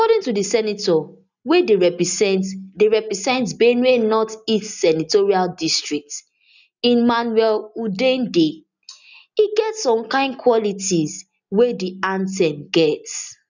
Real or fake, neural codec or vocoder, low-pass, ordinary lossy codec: real; none; 7.2 kHz; none